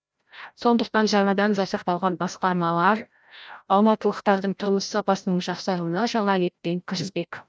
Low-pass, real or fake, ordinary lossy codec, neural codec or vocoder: none; fake; none; codec, 16 kHz, 0.5 kbps, FreqCodec, larger model